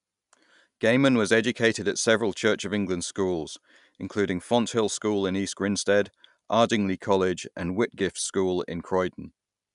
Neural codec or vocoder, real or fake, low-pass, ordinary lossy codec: none; real; 10.8 kHz; none